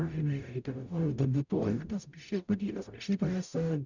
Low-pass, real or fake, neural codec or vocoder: 7.2 kHz; fake; codec, 44.1 kHz, 0.9 kbps, DAC